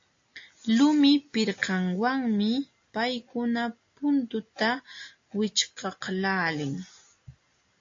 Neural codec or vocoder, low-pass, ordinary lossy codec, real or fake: none; 7.2 kHz; AAC, 48 kbps; real